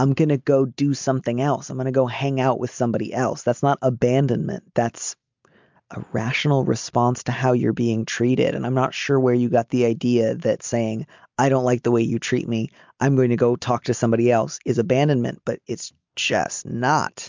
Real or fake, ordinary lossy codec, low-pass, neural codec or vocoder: real; MP3, 64 kbps; 7.2 kHz; none